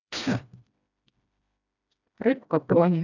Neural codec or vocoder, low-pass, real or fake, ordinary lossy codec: codec, 16 kHz, 1 kbps, FreqCodec, smaller model; 7.2 kHz; fake; none